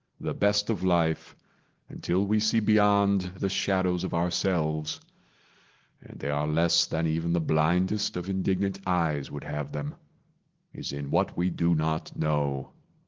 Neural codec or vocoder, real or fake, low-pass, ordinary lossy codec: none; real; 7.2 kHz; Opus, 16 kbps